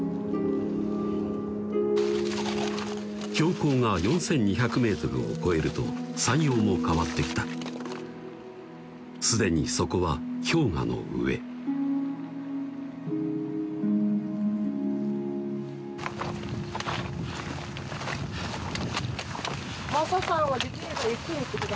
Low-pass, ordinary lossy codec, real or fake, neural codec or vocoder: none; none; real; none